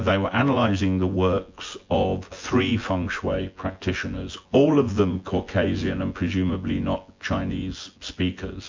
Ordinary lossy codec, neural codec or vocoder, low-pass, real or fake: MP3, 48 kbps; vocoder, 24 kHz, 100 mel bands, Vocos; 7.2 kHz; fake